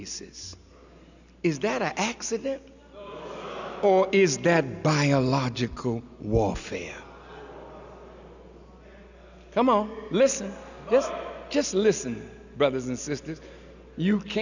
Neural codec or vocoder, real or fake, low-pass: none; real; 7.2 kHz